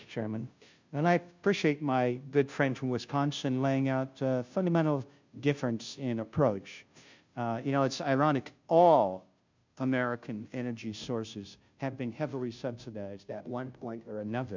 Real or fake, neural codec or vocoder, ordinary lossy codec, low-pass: fake; codec, 16 kHz, 0.5 kbps, FunCodec, trained on Chinese and English, 25 frames a second; MP3, 64 kbps; 7.2 kHz